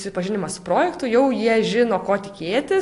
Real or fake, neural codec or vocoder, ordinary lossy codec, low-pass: real; none; AAC, 48 kbps; 10.8 kHz